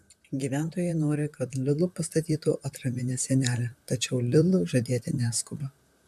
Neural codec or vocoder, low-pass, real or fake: vocoder, 44.1 kHz, 128 mel bands, Pupu-Vocoder; 14.4 kHz; fake